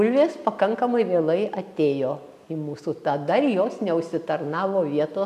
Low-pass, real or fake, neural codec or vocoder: 14.4 kHz; real; none